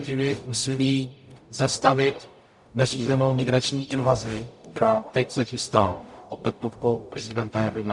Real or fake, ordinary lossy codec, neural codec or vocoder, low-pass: fake; MP3, 96 kbps; codec, 44.1 kHz, 0.9 kbps, DAC; 10.8 kHz